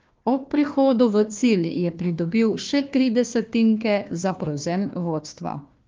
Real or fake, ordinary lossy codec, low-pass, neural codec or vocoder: fake; Opus, 32 kbps; 7.2 kHz; codec, 16 kHz, 1 kbps, FunCodec, trained on Chinese and English, 50 frames a second